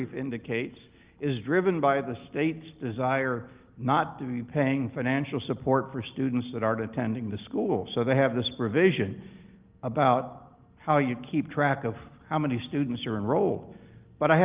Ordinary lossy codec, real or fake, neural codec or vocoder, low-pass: Opus, 32 kbps; real; none; 3.6 kHz